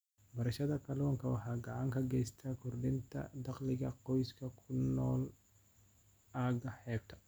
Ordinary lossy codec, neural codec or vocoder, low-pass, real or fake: none; none; none; real